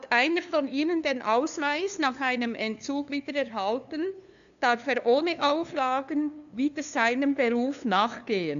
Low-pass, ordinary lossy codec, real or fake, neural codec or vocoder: 7.2 kHz; MP3, 96 kbps; fake; codec, 16 kHz, 2 kbps, FunCodec, trained on LibriTTS, 25 frames a second